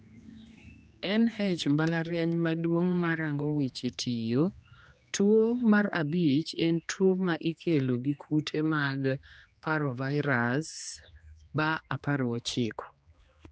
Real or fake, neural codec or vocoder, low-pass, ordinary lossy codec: fake; codec, 16 kHz, 2 kbps, X-Codec, HuBERT features, trained on general audio; none; none